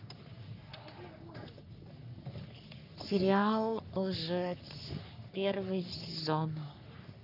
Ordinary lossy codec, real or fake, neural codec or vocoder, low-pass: none; fake; codec, 44.1 kHz, 3.4 kbps, Pupu-Codec; 5.4 kHz